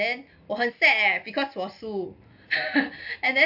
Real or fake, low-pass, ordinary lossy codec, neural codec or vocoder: real; 5.4 kHz; AAC, 48 kbps; none